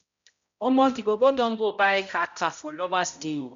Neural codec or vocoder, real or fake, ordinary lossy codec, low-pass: codec, 16 kHz, 0.5 kbps, X-Codec, HuBERT features, trained on balanced general audio; fake; MP3, 64 kbps; 7.2 kHz